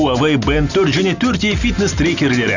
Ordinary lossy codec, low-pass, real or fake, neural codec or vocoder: none; 7.2 kHz; real; none